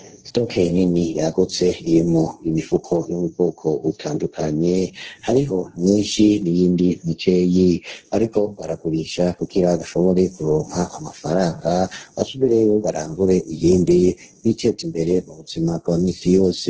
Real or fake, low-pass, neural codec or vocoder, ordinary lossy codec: fake; 7.2 kHz; codec, 16 kHz, 1.1 kbps, Voila-Tokenizer; Opus, 16 kbps